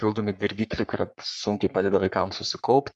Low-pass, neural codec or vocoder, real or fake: 10.8 kHz; codec, 44.1 kHz, 3.4 kbps, Pupu-Codec; fake